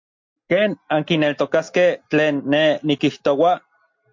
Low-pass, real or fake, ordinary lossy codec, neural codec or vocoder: 7.2 kHz; real; MP3, 48 kbps; none